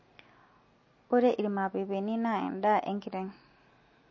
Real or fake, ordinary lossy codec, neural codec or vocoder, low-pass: real; MP3, 32 kbps; none; 7.2 kHz